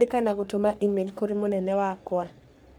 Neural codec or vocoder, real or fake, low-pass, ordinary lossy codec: codec, 44.1 kHz, 3.4 kbps, Pupu-Codec; fake; none; none